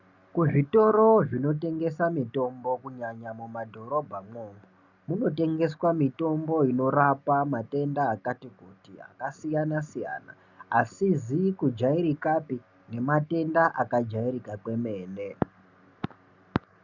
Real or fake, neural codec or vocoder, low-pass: real; none; 7.2 kHz